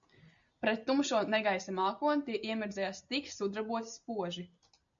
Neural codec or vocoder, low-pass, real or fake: none; 7.2 kHz; real